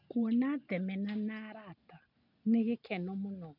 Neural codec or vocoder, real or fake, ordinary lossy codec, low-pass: none; real; none; 5.4 kHz